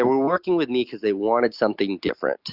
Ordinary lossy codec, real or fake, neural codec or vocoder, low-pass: Opus, 64 kbps; real; none; 5.4 kHz